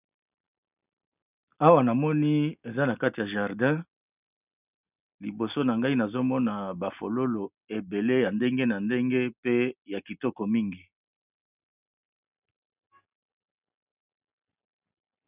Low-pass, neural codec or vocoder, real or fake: 3.6 kHz; none; real